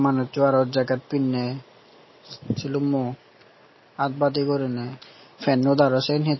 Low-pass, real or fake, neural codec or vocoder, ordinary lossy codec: 7.2 kHz; real; none; MP3, 24 kbps